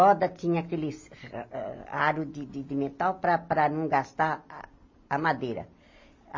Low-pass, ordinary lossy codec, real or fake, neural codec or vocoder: 7.2 kHz; MP3, 32 kbps; real; none